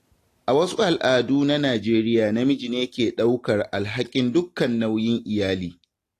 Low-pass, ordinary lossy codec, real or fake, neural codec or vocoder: 14.4 kHz; AAC, 48 kbps; real; none